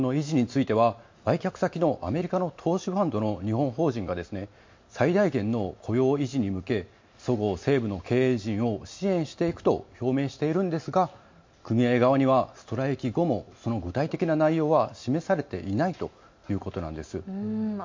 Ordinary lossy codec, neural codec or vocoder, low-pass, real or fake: MP3, 48 kbps; none; 7.2 kHz; real